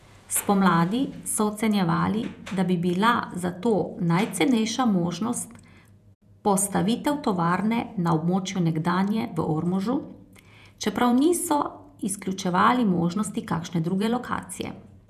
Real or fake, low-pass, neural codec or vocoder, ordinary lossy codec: fake; 14.4 kHz; vocoder, 48 kHz, 128 mel bands, Vocos; none